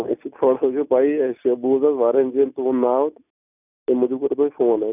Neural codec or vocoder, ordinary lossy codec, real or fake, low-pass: none; none; real; 3.6 kHz